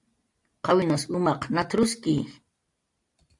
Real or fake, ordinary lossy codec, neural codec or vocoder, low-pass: real; AAC, 64 kbps; none; 10.8 kHz